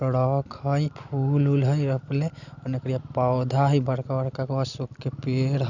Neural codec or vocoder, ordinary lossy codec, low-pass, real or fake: none; none; 7.2 kHz; real